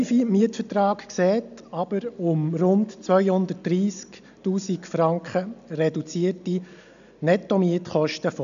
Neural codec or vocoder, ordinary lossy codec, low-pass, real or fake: none; none; 7.2 kHz; real